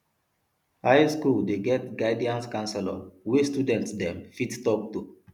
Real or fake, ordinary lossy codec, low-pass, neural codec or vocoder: real; none; 19.8 kHz; none